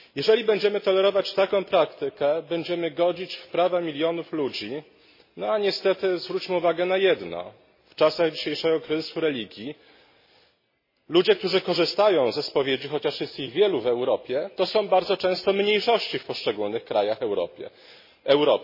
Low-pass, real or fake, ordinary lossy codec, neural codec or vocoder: 5.4 kHz; real; MP3, 24 kbps; none